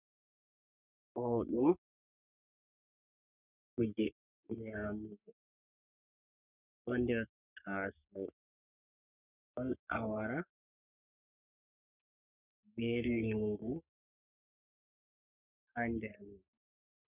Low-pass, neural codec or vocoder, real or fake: 3.6 kHz; codec, 44.1 kHz, 3.4 kbps, Pupu-Codec; fake